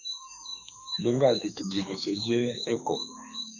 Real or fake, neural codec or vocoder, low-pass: fake; autoencoder, 48 kHz, 32 numbers a frame, DAC-VAE, trained on Japanese speech; 7.2 kHz